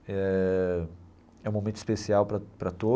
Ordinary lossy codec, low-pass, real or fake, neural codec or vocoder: none; none; real; none